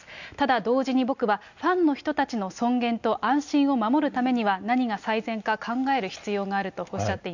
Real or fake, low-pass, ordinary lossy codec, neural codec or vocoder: real; 7.2 kHz; none; none